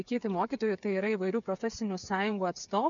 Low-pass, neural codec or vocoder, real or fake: 7.2 kHz; codec, 16 kHz, 8 kbps, FreqCodec, smaller model; fake